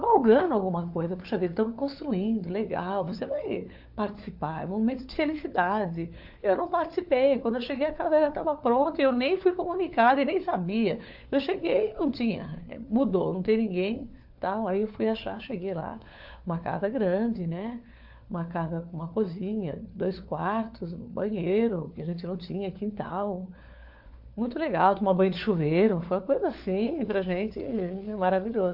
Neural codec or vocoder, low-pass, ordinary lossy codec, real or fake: codec, 16 kHz, 4 kbps, FunCodec, trained on LibriTTS, 50 frames a second; 5.4 kHz; AAC, 48 kbps; fake